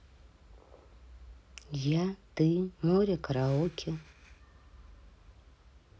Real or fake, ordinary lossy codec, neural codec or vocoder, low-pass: real; none; none; none